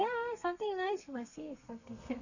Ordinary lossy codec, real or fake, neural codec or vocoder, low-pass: none; fake; codec, 32 kHz, 1.9 kbps, SNAC; 7.2 kHz